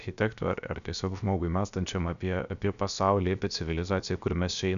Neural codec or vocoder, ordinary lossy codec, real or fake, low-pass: codec, 16 kHz, 0.7 kbps, FocalCodec; MP3, 96 kbps; fake; 7.2 kHz